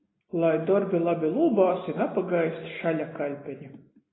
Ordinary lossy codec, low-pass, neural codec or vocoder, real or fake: AAC, 16 kbps; 7.2 kHz; none; real